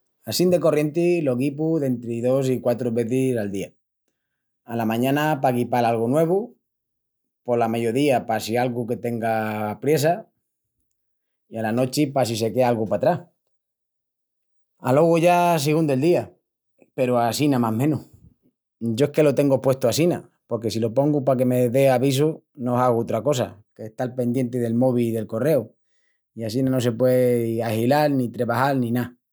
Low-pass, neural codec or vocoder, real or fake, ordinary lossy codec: none; none; real; none